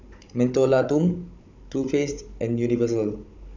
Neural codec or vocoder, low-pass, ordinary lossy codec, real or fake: codec, 16 kHz, 16 kbps, FunCodec, trained on Chinese and English, 50 frames a second; 7.2 kHz; none; fake